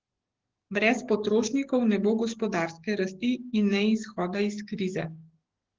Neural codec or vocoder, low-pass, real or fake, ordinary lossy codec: codec, 44.1 kHz, 7.8 kbps, DAC; 7.2 kHz; fake; Opus, 16 kbps